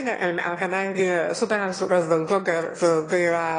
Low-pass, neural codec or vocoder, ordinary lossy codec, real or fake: 9.9 kHz; autoencoder, 22.05 kHz, a latent of 192 numbers a frame, VITS, trained on one speaker; AAC, 32 kbps; fake